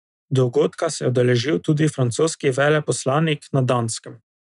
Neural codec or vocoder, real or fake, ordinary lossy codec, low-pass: none; real; none; 10.8 kHz